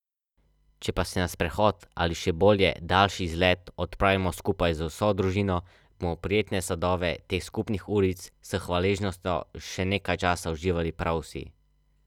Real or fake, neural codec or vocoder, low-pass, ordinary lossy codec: real; none; 19.8 kHz; none